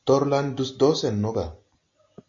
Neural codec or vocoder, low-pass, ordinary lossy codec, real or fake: none; 7.2 kHz; AAC, 48 kbps; real